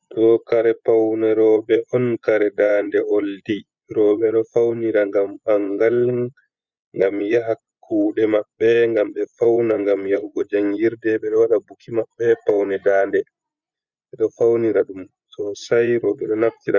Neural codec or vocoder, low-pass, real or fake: vocoder, 24 kHz, 100 mel bands, Vocos; 7.2 kHz; fake